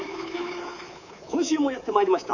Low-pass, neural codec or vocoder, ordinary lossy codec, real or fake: 7.2 kHz; codec, 24 kHz, 3.1 kbps, DualCodec; none; fake